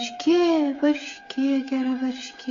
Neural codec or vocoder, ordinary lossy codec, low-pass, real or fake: codec, 16 kHz, 8 kbps, FreqCodec, smaller model; AAC, 48 kbps; 7.2 kHz; fake